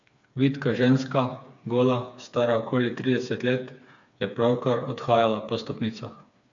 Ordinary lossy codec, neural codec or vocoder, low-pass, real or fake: AAC, 64 kbps; codec, 16 kHz, 4 kbps, FreqCodec, smaller model; 7.2 kHz; fake